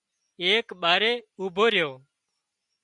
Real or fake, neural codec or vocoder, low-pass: real; none; 10.8 kHz